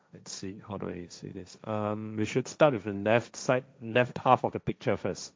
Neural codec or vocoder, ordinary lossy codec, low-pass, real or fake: codec, 16 kHz, 1.1 kbps, Voila-Tokenizer; none; none; fake